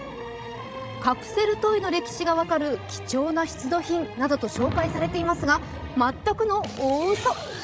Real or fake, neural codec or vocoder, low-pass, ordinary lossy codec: fake; codec, 16 kHz, 16 kbps, FreqCodec, larger model; none; none